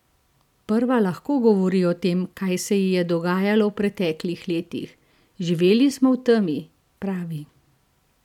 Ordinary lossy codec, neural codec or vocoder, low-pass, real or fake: none; none; 19.8 kHz; real